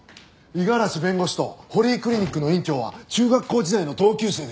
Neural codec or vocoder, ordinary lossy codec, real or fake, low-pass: none; none; real; none